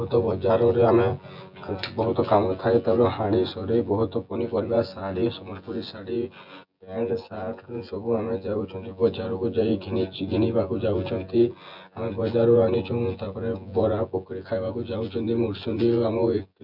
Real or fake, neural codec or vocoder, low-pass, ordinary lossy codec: fake; vocoder, 24 kHz, 100 mel bands, Vocos; 5.4 kHz; none